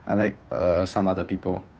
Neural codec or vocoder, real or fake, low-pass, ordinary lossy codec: codec, 16 kHz, 2 kbps, FunCodec, trained on Chinese and English, 25 frames a second; fake; none; none